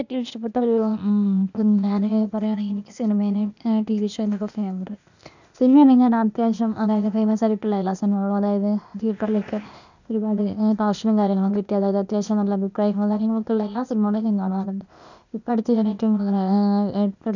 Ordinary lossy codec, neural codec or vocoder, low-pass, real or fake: none; codec, 16 kHz, 0.8 kbps, ZipCodec; 7.2 kHz; fake